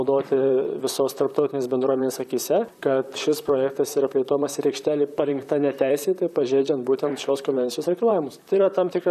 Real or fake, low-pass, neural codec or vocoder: fake; 14.4 kHz; vocoder, 44.1 kHz, 128 mel bands, Pupu-Vocoder